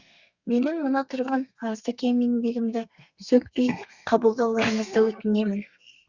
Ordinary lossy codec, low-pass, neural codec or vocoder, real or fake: Opus, 64 kbps; 7.2 kHz; codec, 32 kHz, 1.9 kbps, SNAC; fake